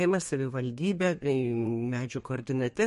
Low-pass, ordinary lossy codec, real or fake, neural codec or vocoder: 14.4 kHz; MP3, 48 kbps; fake; codec, 32 kHz, 1.9 kbps, SNAC